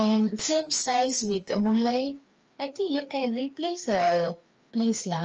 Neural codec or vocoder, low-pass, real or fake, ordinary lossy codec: codec, 16 kHz, 2 kbps, FreqCodec, larger model; 7.2 kHz; fake; Opus, 24 kbps